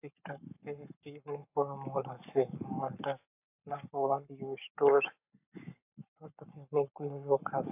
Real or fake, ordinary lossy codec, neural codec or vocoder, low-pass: fake; AAC, 32 kbps; codec, 44.1 kHz, 7.8 kbps, Pupu-Codec; 3.6 kHz